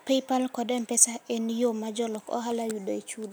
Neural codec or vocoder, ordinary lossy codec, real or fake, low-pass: none; none; real; none